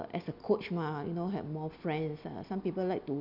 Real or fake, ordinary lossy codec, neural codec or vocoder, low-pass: real; none; none; 5.4 kHz